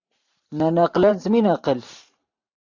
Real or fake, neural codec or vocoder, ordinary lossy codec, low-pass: fake; vocoder, 44.1 kHz, 128 mel bands every 512 samples, BigVGAN v2; AAC, 48 kbps; 7.2 kHz